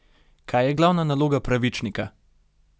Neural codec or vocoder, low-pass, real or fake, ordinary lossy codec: none; none; real; none